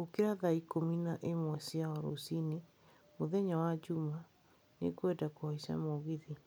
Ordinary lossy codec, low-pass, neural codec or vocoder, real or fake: none; none; none; real